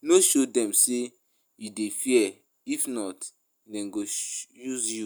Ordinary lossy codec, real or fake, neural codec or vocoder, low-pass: none; real; none; none